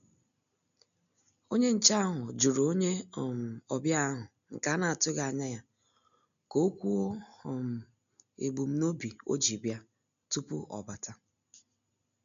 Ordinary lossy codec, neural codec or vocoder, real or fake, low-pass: none; none; real; 7.2 kHz